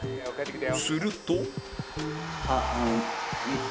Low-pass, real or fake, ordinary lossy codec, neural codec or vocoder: none; real; none; none